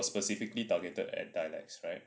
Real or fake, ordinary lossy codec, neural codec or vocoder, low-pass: real; none; none; none